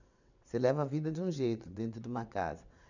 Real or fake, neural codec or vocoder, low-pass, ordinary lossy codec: fake; vocoder, 22.05 kHz, 80 mel bands, WaveNeXt; 7.2 kHz; none